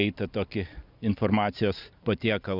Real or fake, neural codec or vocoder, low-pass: real; none; 5.4 kHz